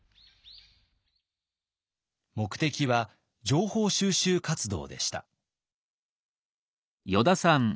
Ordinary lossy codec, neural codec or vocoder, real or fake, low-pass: none; none; real; none